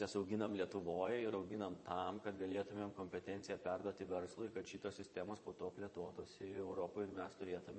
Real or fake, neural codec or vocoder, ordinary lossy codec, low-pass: fake; vocoder, 22.05 kHz, 80 mel bands, Vocos; MP3, 32 kbps; 9.9 kHz